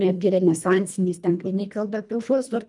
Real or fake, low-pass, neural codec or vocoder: fake; 10.8 kHz; codec, 24 kHz, 1.5 kbps, HILCodec